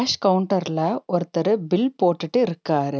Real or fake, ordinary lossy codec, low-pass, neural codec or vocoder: real; none; none; none